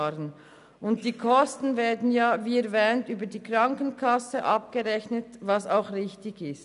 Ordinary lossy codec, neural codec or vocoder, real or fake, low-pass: none; none; real; 10.8 kHz